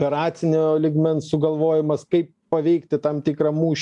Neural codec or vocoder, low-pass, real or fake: none; 10.8 kHz; real